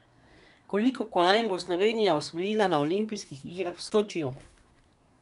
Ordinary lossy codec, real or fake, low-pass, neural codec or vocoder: none; fake; 10.8 kHz; codec, 24 kHz, 1 kbps, SNAC